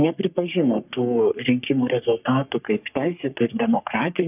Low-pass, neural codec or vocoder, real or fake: 3.6 kHz; codec, 44.1 kHz, 3.4 kbps, Pupu-Codec; fake